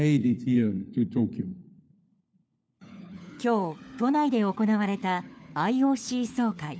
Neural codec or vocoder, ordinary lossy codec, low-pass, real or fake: codec, 16 kHz, 4 kbps, FunCodec, trained on LibriTTS, 50 frames a second; none; none; fake